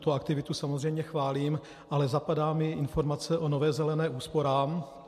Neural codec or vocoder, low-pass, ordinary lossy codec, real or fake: none; 14.4 kHz; MP3, 64 kbps; real